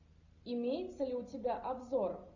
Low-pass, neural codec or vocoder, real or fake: 7.2 kHz; none; real